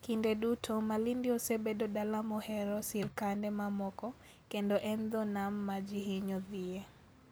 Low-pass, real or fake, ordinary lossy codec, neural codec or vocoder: none; real; none; none